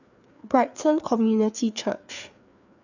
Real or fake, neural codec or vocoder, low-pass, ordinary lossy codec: fake; codec, 16 kHz, 4 kbps, FreqCodec, larger model; 7.2 kHz; none